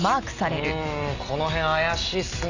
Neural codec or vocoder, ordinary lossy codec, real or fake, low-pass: none; none; real; 7.2 kHz